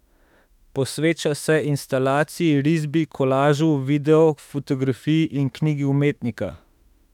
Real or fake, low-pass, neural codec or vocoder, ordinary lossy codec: fake; 19.8 kHz; autoencoder, 48 kHz, 32 numbers a frame, DAC-VAE, trained on Japanese speech; none